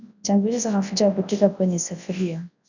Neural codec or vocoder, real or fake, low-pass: codec, 24 kHz, 0.9 kbps, WavTokenizer, large speech release; fake; 7.2 kHz